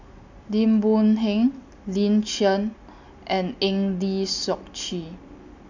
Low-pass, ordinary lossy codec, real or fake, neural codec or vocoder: 7.2 kHz; none; real; none